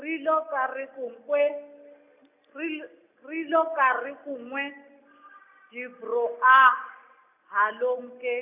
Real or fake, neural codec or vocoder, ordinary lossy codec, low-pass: real; none; none; 3.6 kHz